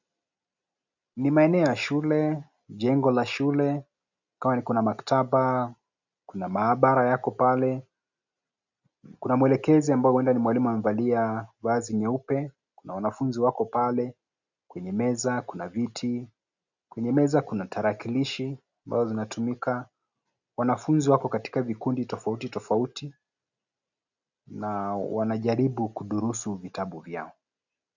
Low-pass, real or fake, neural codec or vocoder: 7.2 kHz; real; none